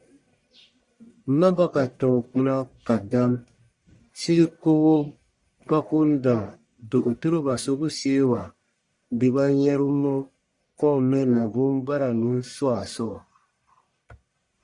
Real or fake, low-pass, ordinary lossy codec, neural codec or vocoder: fake; 10.8 kHz; Opus, 64 kbps; codec, 44.1 kHz, 1.7 kbps, Pupu-Codec